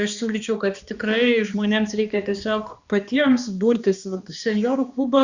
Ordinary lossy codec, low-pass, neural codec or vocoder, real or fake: Opus, 64 kbps; 7.2 kHz; codec, 16 kHz, 2 kbps, X-Codec, HuBERT features, trained on balanced general audio; fake